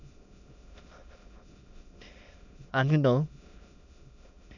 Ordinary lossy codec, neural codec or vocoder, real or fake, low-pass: none; autoencoder, 22.05 kHz, a latent of 192 numbers a frame, VITS, trained on many speakers; fake; 7.2 kHz